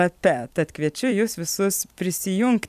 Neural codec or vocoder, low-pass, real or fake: vocoder, 44.1 kHz, 128 mel bands every 256 samples, BigVGAN v2; 14.4 kHz; fake